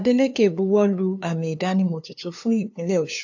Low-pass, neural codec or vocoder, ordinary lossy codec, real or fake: 7.2 kHz; codec, 16 kHz, 2 kbps, FunCodec, trained on LibriTTS, 25 frames a second; AAC, 48 kbps; fake